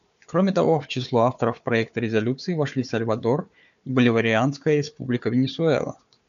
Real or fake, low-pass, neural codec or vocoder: fake; 7.2 kHz; codec, 16 kHz, 4 kbps, FunCodec, trained on Chinese and English, 50 frames a second